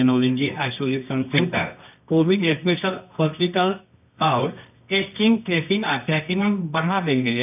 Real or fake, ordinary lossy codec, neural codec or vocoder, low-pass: fake; none; codec, 24 kHz, 0.9 kbps, WavTokenizer, medium music audio release; 3.6 kHz